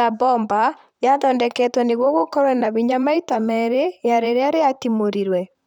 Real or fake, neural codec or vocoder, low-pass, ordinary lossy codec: fake; vocoder, 44.1 kHz, 128 mel bands, Pupu-Vocoder; 19.8 kHz; none